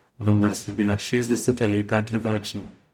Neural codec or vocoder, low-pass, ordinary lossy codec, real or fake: codec, 44.1 kHz, 0.9 kbps, DAC; 19.8 kHz; none; fake